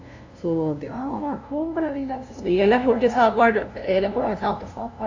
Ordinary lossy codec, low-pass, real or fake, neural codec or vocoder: AAC, 48 kbps; 7.2 kHz; fake; codec, 16 kHz, 0.5 kbps, FunCodec, trained on LibriTTS, 25 frames a second